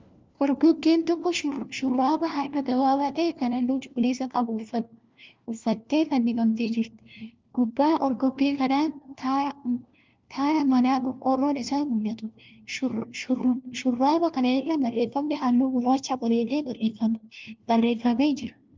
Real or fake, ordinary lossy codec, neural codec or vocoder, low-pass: fake; Opus, 32 kbps; codec, 16 kHz, 1 kbps, FunCodec, trained on LibriTTS, 50 frames a second; 7.2 kHz